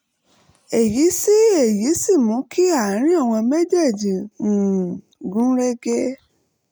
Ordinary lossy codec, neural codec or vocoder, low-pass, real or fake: none; none; none; real